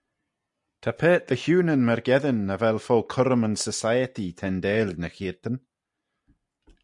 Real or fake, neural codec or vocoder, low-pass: real; none; 10.8 kHz